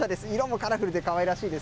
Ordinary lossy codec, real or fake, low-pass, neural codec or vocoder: none; real; none; none